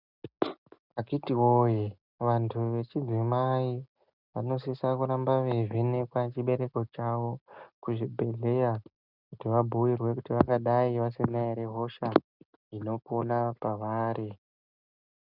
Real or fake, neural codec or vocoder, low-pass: real; none; 5.4 kHz